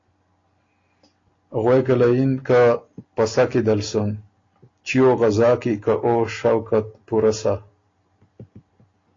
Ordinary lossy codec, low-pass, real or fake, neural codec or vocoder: AAC, 32 kbps; 7.2 kHz; real; none